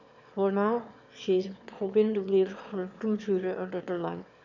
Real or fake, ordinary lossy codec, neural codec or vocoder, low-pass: fake; none; autoencoder, 22.05 kHz, a latent of 192 numbers a frame, VITS, trained on one speaker; 7.2 kHz